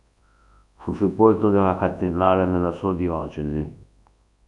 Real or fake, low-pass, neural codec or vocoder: fake; 10.8 kHz; codec, 24 kHz, 0.9 kbps, WavTokenizer, large speech release